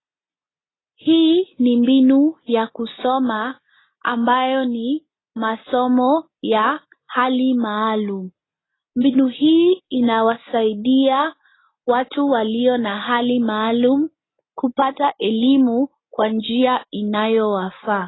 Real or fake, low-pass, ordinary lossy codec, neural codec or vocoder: real; 7.2 kHz; AAC, 16 kbps; none